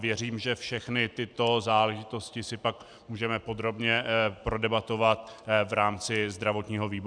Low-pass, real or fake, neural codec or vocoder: 9.9 kHz; real; none